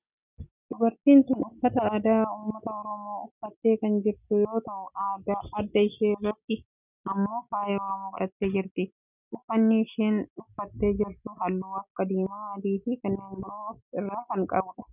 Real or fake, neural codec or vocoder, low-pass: real; none; 3.6 kHz